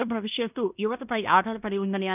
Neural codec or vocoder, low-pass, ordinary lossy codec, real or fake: codec, 16 kHz in and 24 kHz out, 0.9 kbps, LongCat-Audio-Codec, fine tuned four codebook decoder; 3.6 kHz; none; fake